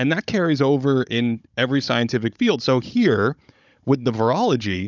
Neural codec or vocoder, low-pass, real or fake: codec, 16 kHz, 16 kbps, FunCodec, trained on Chinese and English, 50 frames a second; 7.2 kHz; fake